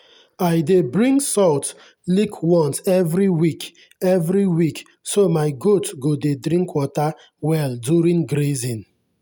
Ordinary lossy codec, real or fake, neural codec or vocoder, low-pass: none; real; none; none